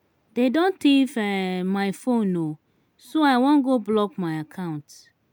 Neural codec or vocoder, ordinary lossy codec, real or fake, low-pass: none; none; real; none